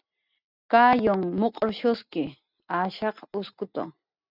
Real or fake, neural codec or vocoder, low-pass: real; none; 5.4 kHz